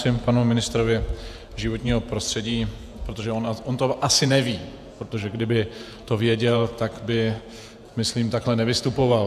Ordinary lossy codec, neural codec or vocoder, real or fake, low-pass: AAC, 96 kbps; vocoder, 48 kHz, 128 mel bands, Vocos; fake; 14.4 kHz